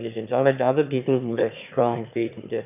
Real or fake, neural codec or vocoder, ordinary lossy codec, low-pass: fake; autoencoder, 22.05 kHz, a latent of 192 numbers a frame, VITS, trained on one speaker; none; 3.6 kHz